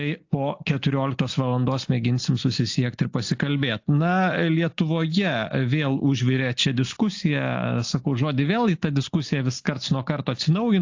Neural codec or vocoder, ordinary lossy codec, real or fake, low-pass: none; AAC, 48 kbps; real; 7.2 kHz